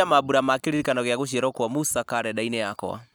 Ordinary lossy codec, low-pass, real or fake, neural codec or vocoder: none; none; real; none